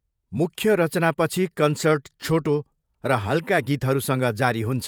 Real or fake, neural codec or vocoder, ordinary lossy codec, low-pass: fake; vocoder, 48 kHz, 128 mel bands, Vocos; none; none